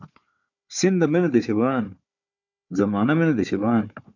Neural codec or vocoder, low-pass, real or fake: codec, 16 kHz, 4 kbps, FunCodec, trained on Chinese and English, 50 frames a second; 7.2 kHz; fake